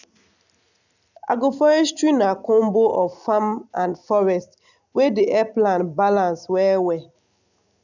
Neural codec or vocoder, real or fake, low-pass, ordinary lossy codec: none; real; 7.2 kHz; none